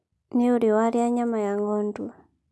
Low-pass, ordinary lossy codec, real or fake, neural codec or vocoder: none; none; real; none